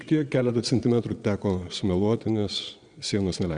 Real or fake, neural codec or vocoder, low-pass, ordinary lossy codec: fake; vocoder, 22.05 kHz, 80 mel bands, Vocos; 9.9 kHz; Opus, 64 kbps